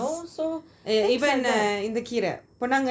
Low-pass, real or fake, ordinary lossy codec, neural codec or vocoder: none; real; none; none